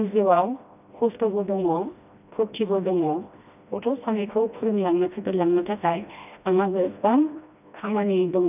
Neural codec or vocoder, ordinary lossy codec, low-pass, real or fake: codec, 16 kHz, 1 kbps, FreqCodec, smaller model; none; 3.6 kHz; fake